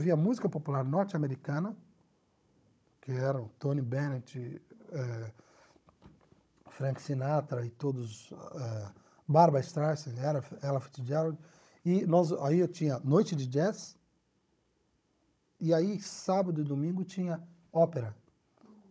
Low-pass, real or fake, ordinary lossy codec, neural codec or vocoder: none; fake; none; codec, 16 kHz, 16 kbps, FunCodec, trained on Chinese and English, 50 frames a second